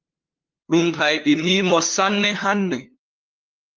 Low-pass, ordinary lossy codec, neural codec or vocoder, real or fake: 7.2 kHz; Opus, 32 kbps; codec, 16 kHz, 2 kbps, FunCodec, trained on LibriTTS, 25 frames a second; fake